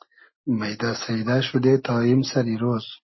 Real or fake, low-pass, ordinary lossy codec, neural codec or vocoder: real; 7.2 kHz; MP3, 24 kbps; none